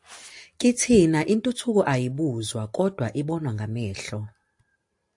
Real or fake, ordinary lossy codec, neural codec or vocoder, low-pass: real; AAC, 64 kbps; none; 10.8 kHz